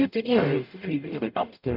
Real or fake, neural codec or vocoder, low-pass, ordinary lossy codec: fake; codec, 44.1 kHz, 0.9 kbps, DAC; 5.4 kHz; none